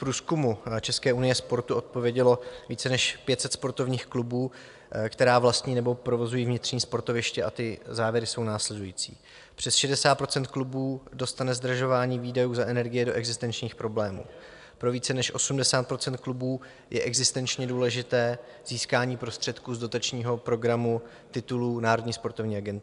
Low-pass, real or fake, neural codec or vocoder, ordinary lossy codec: 10.8 kHz; real; none; AAC, 96 kbps